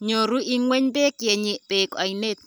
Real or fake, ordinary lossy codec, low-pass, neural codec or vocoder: real; none; none; none